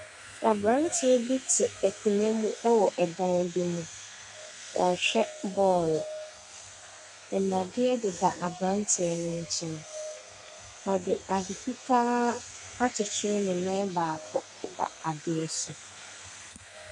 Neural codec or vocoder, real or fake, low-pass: codec, 32 kHz, 1.9 kbps, SNAC; fake; 10.8 kHz